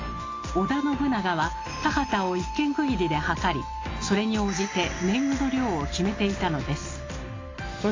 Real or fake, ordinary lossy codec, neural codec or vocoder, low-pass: real; AAC, 32 kbps; none; 7.2 kHz